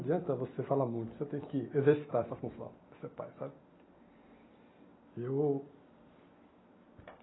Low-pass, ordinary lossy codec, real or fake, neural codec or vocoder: 7.2 kHz; AAC, 16 kbps; real; none